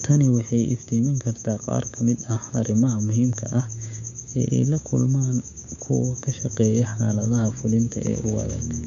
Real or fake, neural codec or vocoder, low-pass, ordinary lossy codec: fake; codec, 16 kHz, 6 kbps, DAC; 7.2 kHz; MP3, 96 kbps